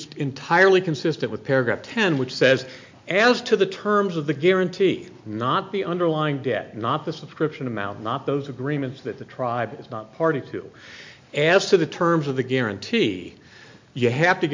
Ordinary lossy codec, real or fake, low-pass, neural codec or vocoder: MP3, 48 kbps; real; 7.2 kHz; none